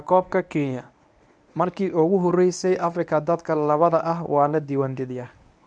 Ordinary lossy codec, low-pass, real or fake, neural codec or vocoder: none; 9.9 kHz; fake; codec, 24 kHz, 0.9 kbps, WavTokenizer, medium speech release version 1